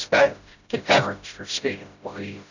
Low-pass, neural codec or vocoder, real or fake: 7.2 kHz; codec, 16 kHz, 0.5 kbps, FreqCodec, smaller model; fake